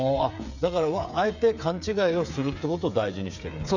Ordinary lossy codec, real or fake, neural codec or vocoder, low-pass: none; fake; codec, 16 kHz, 8 kbps, FreqCodec, smaller model; 7.2 kHz